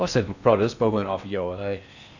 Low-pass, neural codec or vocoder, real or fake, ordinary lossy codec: 7.2 kHz; codec, 16 kHz in and 24 kHz out, 0.8 kbps, FocalCodec, streaming, 65536 codes; fake; none